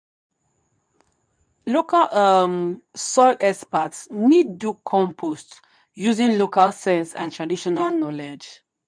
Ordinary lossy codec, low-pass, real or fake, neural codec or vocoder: none; 9.9 kHz; fake; codec, 24 kHz, 0.9 kbps, WavTokenizer, medium speech release version 2